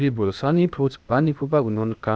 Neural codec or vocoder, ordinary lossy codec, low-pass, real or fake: codec, 16 kHz, 0.8 kbps, ZipCodec; none; none; fake